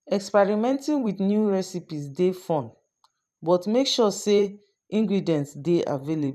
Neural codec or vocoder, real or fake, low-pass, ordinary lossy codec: vocoder, 44.1 kHz, 128 mel bands every 512 samples, BigVGAN v2; fake; 14.4 kHz; none